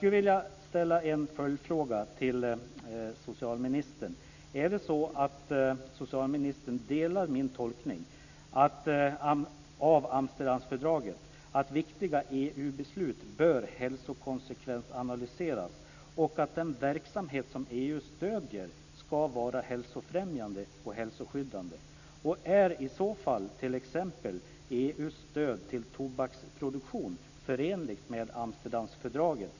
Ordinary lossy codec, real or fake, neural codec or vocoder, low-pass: none; real; none; 7.2 kHz